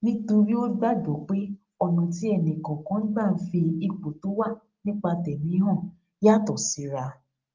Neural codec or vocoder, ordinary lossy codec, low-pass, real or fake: none; Opus, 32 kbps; 7.2 kHz; real